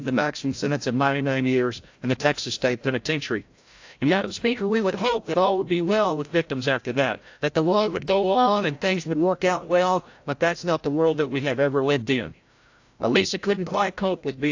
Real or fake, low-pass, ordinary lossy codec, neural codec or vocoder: fake; 7.2 kHz; AAC, 48 kbps; codec, 16 kHz, 0.5 kbps, FreqCodec, larger model